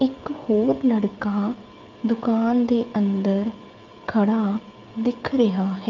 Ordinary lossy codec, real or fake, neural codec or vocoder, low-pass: Opus, 24 kbps; fake; codec, 16 kHz, 16 kbps, FreqCodec, smaller model; 7.2 kHz